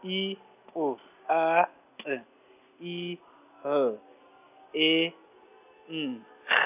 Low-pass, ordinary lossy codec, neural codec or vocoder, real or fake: 3.6 kHz; none; none; real